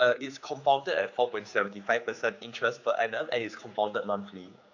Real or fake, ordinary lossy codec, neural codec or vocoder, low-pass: fake; AAC, 48 kbps; codec, 16 kHz, 4 kbps, X-Codec, HuBERT features, trained on general audio; 7.2 kHz